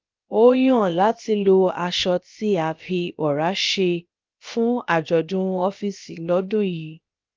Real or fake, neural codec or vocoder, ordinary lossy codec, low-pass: fake; codec, 16 kHz, about 1 kbps, DyCAST, with the encoder's durations; Opus, 32 kbps; 7.2 kHz